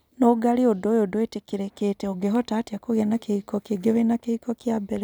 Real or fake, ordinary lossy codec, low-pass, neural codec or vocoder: real; none; none; none